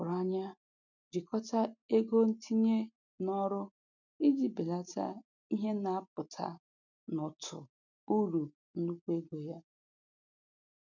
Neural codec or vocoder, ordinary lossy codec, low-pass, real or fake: none; none; 7.2 kHz; real